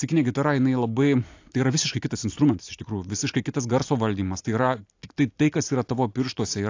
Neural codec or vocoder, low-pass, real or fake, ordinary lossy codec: none; 7.2 kHz; real; AAC, 48 kbps